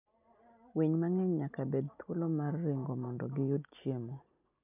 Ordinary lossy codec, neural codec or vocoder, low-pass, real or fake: none; none; 3.6 kHz; real